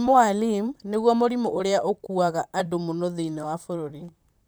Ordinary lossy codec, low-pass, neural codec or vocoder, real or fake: none; none; vocoder, 44.1 kHz, 128 mel bands, Pupu-Vocoder; fake